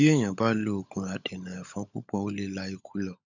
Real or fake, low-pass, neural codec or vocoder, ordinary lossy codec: fake; 7.2 kHz; codec, 16 kHz, 16 kbps, FunCodec, trained on LibriTTS, 50 frames a second; none